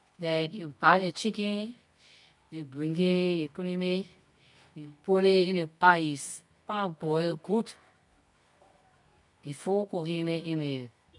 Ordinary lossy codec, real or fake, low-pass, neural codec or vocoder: none; fake; 10.8 kHz; codec, 24 kHz, 0.9 kbps, WavTokenizer, medium music audio release